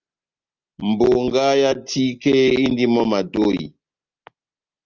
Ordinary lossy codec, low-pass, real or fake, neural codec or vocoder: Opus, 32 kbps; 7.2 kHz; real; none